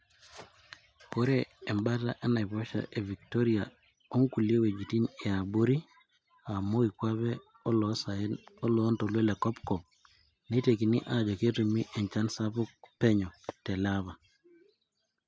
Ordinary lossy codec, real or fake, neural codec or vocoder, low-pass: none; real; none; none